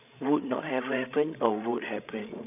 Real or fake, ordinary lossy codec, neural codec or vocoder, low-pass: fake; none; codec, 16 kHz, 16 kbps, FreqCodec, larger model; 3.6 kHz